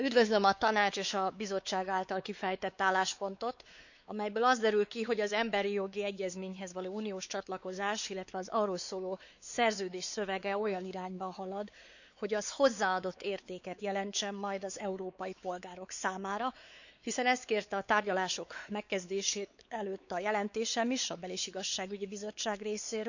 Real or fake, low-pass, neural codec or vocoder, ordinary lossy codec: fake; 7.2 kHz; codec, 16 kHz, 4 kbps, X-Codec, WavLM features, trained on Multilingual LibriSpeech; none